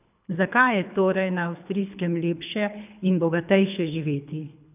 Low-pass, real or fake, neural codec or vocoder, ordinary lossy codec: 3.6 kHz; fake; codec, 24 kHz, 3 kbps, HILCodec; none